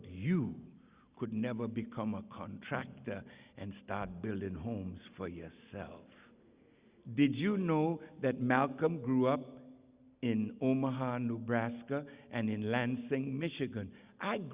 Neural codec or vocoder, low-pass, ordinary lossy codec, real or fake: none; 3.6 kHz; Opus, 64 kbps; real